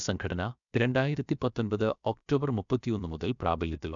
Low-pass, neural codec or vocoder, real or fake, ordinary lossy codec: 7.2 kHz; codec, 16 kHz, about 1 kbps, DyCAST, with the encoder's durations; fake; none